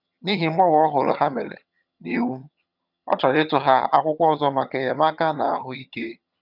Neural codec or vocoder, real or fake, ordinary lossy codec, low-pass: vocoder, 22.05 kHz, 80 mel bands, HiFi-GAN; fake; none; 5.4 kHz